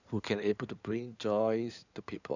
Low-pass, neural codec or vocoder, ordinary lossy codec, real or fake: 7.2 kHz; codec, 16 kHz in and 24 kHz out, 2.2 kbps, FireRedTTS-2 codec; none; fake